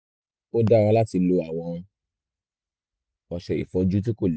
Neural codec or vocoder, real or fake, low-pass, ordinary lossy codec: none; real; none; none